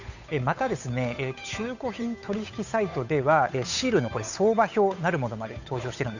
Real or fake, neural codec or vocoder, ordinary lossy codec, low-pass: fake; codec, 16 kHz, 8 kbps, FunCodec, trained on Chinese and English, 25 frames a second; none; 7.2 kHz